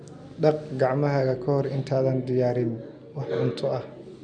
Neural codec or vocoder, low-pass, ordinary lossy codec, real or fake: none; 9.9 kHz; none; real